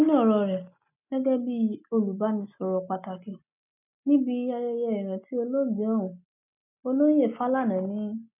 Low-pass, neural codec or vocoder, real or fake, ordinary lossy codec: 3.6 kHz; none; real; none